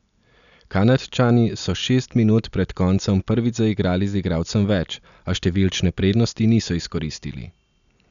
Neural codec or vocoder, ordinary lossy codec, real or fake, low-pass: none; none; real; 7.2 kHz